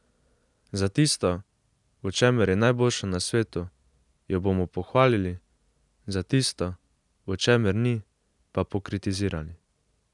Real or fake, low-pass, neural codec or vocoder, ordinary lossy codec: real; 10.8 kHz; none; none